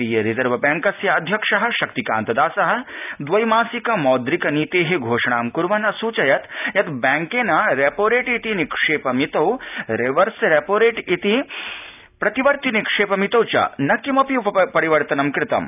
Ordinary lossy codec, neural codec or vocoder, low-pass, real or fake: none; none; 3.6 kHz; real